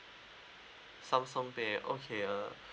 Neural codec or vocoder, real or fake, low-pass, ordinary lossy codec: none; real; none; none